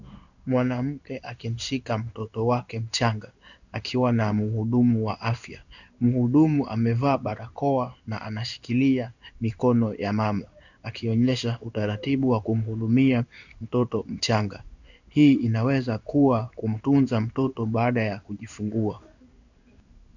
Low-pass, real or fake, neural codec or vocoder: 7.2 kHz; fake; codec, 16 kHz in and 24 kHz out, 1 kbps, XY-Tokenizer